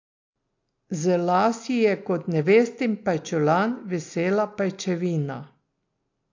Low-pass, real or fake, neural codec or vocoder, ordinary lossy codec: 7.2 kHz; real; none; AAC, 48 kbps